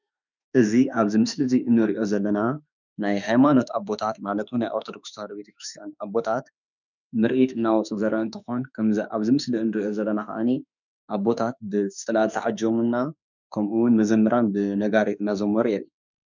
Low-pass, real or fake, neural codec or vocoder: 7.2 kHz; fake; autoencoder, 48 kHz, 32 numbers a frame, DAC-VAE, trained on Japanese speech